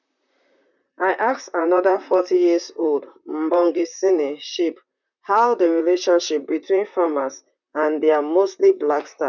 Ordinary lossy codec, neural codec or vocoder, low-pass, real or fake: none; vocoder, 44.1 kHz, 128 mel bands, Pupu-Vocoder; 7.2 kHz; fake